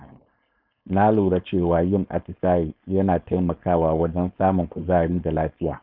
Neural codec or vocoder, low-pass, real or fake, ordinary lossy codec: codec, 16 kHz, 4.8 kbps, FACodec; 5.4 kHz; fake; none